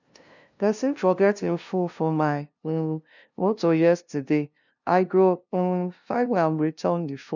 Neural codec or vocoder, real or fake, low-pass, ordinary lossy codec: codec, 16 kHz, 0.5 kbps, FunCodec, trained on LibriTTS, 25 frames a second; fake; 7.2 kHz; none